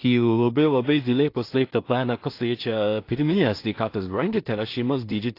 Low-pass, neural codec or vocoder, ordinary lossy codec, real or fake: 5.4 kHz; codec, 16 kHz in and 24 kHz out, 0.4 kbps, LongCat-Audio-Codec, two codebook decoder; AAC, 32 kbps; fake